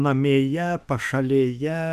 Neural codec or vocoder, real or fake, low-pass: autoencoder, 48 kHz, 32 numbers a frame, DAC-VAE, trained on Japanese speech; fake; 14.4 kHz